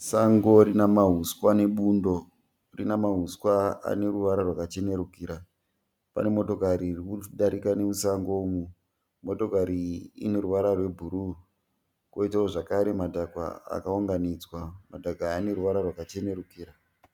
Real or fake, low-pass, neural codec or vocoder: real; 19.8 kHz; none